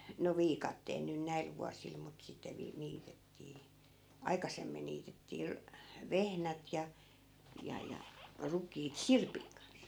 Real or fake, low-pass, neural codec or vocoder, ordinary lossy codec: real; none; none; none